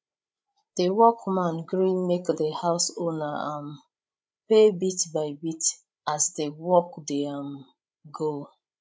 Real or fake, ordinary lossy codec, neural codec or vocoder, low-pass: fake; none; codec, 16 kHz, 16 kbps, FreqCodec, larger model; none